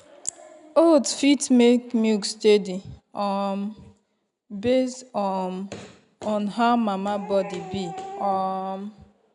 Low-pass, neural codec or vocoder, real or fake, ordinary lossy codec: 10.8 kHz; none; real; none